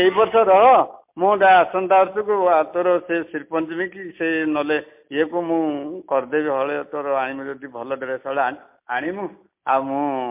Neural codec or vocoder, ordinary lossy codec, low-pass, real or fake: none; MP3, 32 kbps; 3.6 kHz; real